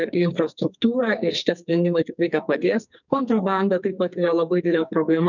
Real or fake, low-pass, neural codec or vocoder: fake; 7.2 kHz; codec, 44.1 kHz, 2.6 kbps, SNAC